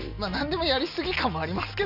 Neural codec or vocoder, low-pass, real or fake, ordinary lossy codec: none; 5.4 kHz; real; none